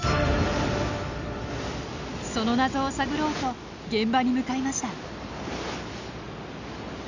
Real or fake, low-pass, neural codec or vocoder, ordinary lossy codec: real; 7.2 kHz; none; none